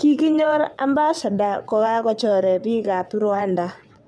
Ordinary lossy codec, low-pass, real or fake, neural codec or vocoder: none; none; fake; vocoder, 22.05 kHz, 80 mel bands, WaveNeXt